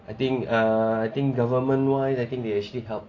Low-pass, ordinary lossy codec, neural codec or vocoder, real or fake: 7.2 kHz; AAC, 32 kbps; none; real